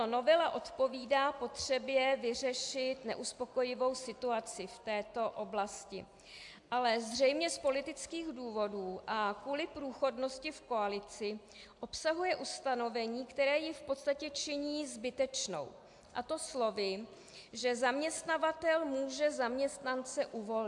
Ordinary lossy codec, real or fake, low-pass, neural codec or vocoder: AAC, 64 kbps; real; 10.8 kHz; none